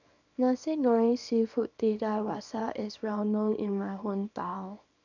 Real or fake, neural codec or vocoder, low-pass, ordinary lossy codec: fake; codec, 24 kHz, 0.9 kbps, WavTokenizer, small release; 7.2 kHz; none